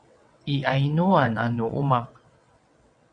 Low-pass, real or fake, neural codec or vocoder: 9.9 kHz; fake; vocoder, 22.05 kHz, 80 mel bands, WaveNeXt